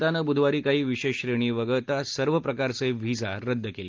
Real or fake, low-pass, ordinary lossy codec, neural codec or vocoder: real; 7.2 kHz; Opus, 32 kbps; none